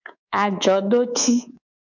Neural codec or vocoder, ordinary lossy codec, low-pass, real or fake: codec, 24 kHz, 3.1 kbps, DualCodec; MP3, 64 kbps; 7.2 kHz; fake